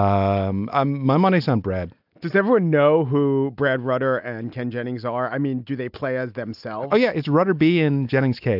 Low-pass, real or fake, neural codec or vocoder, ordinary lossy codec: 5.4 kHz; real; none; AAC, 48 kbps